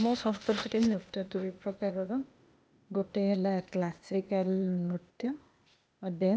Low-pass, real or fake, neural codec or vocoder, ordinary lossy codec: none; fake; codec, 16 kHz, 0.8 kbps, ZipCodec; none